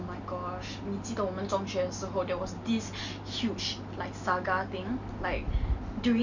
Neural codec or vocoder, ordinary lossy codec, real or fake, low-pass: none; none; real; 7.2 kHz